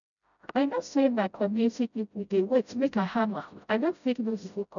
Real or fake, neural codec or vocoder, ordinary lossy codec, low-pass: fake; codec, 16 kHz, 0.5 kbps, FreqCodec, smaller model; none; 7.2 kHz